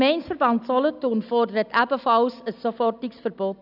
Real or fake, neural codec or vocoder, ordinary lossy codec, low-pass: real; none; none; 5.4 kHz